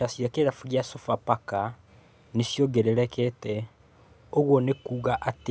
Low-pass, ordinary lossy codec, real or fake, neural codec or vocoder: none; none; real; none